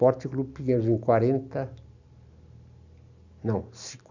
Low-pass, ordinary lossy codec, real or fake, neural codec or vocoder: 7.2 kHz; none; real; none